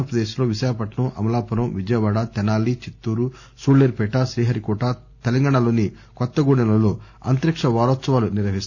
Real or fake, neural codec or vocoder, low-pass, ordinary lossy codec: real; none; 7.2 kHz; none